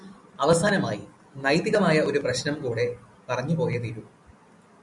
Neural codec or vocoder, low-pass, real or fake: none; 10.8 kHz; real